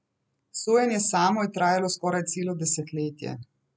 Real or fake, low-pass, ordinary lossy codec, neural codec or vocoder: real; none; none; none